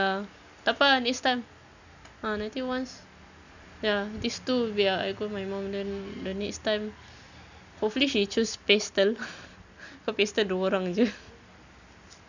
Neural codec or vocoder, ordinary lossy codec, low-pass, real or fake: none; none; 7.2 kHz; real